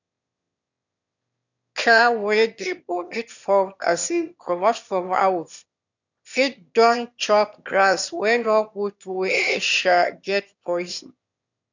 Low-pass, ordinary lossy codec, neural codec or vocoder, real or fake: 7.2 kHz; none; autoencoder, 22.05 kHz, a latent of 192 numbers a frame, VITS, trained on one speaker; fake